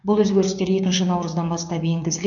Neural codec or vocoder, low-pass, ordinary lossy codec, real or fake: codec, 16 kHz, 6 kbps, DAC; 7.2 kHz; none; fake